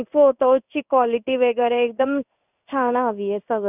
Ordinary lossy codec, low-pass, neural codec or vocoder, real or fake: none; 3.6 kHz; codec, 16 kHz in and 24 kHz out, 1 kbps, XY-Tokenizer; fake